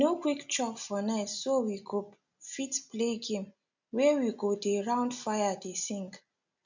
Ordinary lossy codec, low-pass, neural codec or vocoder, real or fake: none; 7.2 kHz; none; real